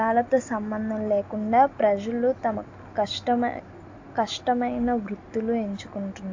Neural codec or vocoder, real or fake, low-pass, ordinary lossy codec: none; real; 7.2 kHz; AAC, 48 kbps